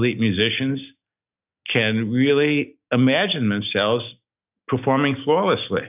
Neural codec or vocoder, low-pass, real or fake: none; 3.6 kHz; real